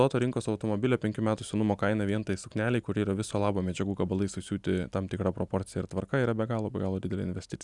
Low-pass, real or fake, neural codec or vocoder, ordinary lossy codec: 10.8 kHz; real; none; Opus, 64 kbps